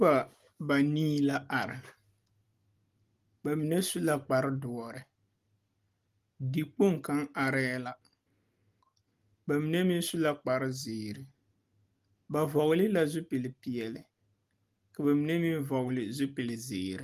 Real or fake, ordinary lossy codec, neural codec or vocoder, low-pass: real; Opus, 24 kbps; none; 14.4 kHz